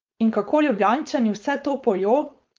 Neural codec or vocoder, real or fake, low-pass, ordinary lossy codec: codec, 16 kHz, 4.8 kbps, FACodec; fake; 7.2 kHz; Opus, 24 kbps